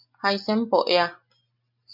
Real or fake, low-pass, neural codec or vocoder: real; 5.4 kHz; none